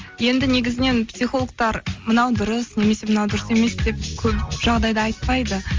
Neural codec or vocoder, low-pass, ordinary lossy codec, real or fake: none; 7.2 kHz; Opus, 32 kbps; real